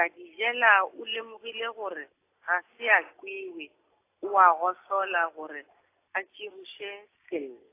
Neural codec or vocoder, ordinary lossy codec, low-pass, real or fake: none; AAC, 24 kbps; 3.6 kHz; real